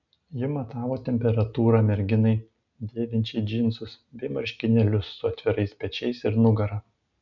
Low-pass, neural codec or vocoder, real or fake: 7.2 kHz; none; real